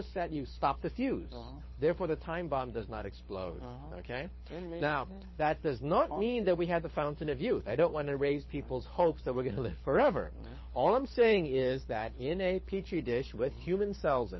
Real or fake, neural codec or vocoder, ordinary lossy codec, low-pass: fake; codec, 24 kHz, 6 kbps, HILCodec; MP3, 24 kbps; 7.2 kHz